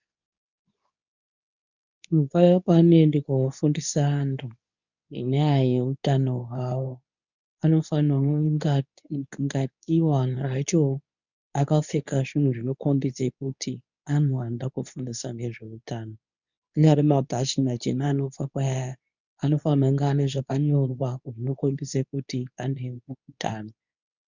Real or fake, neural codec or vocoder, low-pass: fake; codec, 24 kHz, 0.9 kbps, WavTokenizer, medium speech release version 2; 7.2 kHz